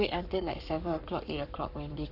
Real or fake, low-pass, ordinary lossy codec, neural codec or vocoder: fake; 5.4 kHz; none; codec, 44.1 kHz, 7.8 kbps, Pupu-Codec